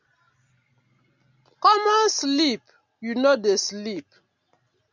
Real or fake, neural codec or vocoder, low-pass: real; none; 7.2 kHz